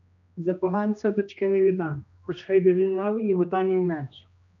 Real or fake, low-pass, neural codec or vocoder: fake; 7.2 kHz; codec, 16 kHz, 1 kbps, X-Codec, HuBERT features, trained on general audio